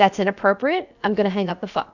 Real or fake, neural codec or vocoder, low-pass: fake; codec, 16 kHz, about 1 kbps, DyCAST, with the encoder's durations; 7.2 kHz